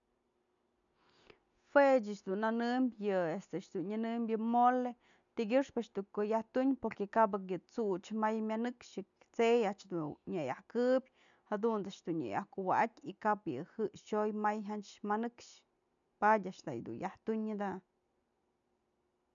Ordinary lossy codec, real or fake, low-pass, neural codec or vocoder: none; real; 7.2 kHz; none